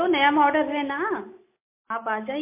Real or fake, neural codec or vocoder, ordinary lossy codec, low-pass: real; none; MP3, 24 kbps; 3.6 kHz